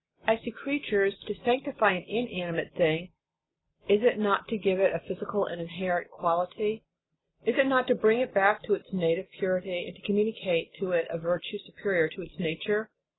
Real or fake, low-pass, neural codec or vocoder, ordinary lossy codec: real; 7.2 kHz; none; AAC, 16 kbps